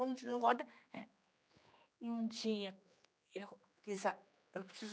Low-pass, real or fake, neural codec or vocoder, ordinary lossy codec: none; fake; codec, 16 kHz, 2 kbps, X-Codec, HuBERT features, trained on general audio; none